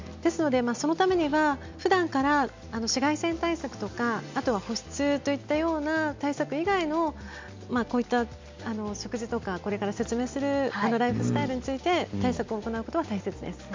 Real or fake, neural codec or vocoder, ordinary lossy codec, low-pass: real; none; MP3, 64 kbps; 7.2 kHz